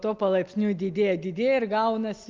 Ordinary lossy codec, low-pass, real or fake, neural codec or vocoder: Opus, 24 kbps; 7.2 kHz; real; none